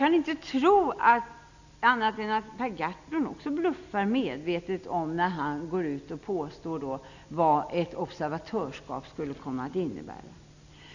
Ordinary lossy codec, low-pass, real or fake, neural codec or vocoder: none; 7.2 kHz; real; none